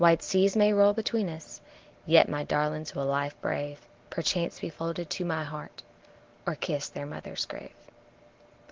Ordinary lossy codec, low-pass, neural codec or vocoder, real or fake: Opus, 16 kbps; 7.2 kHz; none; real